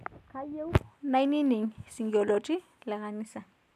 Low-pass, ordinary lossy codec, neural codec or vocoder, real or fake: 14.4 kHz; none; none; real